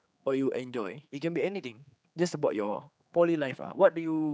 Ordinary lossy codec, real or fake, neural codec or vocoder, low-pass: none; fake; codec, 16 kHz, 4 kbps, X-Codec, HuBERT features, trained on general audio; none